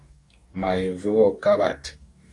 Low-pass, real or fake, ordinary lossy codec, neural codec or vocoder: 10.8 kHz; fake; AAC, 48 kbps; codec, 44.1 kHz, 2.6 kbps, DAC